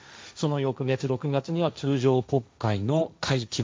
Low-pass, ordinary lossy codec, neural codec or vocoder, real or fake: none; none; codec, 16 kHz, 1.1 kbps, Voila-Tokenizer; fake